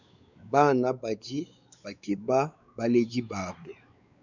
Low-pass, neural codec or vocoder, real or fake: 7.2 kHz; codec, 16 kHz, 4 kbps, X-Codec, WavLM features, trained on Multilingual LibriSpeech; fake